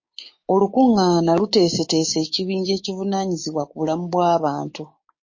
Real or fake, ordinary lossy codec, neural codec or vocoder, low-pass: fake; MP3, 32 kbps; codec, 16 kHz, 6 kbps, DAC; 7.2 kHz